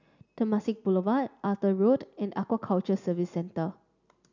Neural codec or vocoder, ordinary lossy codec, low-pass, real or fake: none; none; 7.2 kHz; real